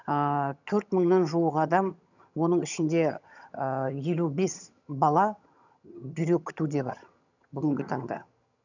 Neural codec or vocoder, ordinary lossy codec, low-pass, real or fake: vocoder, 22.05 kHz, 80 mel bands, HiFi-GAN; none; 7.2 kHz; fake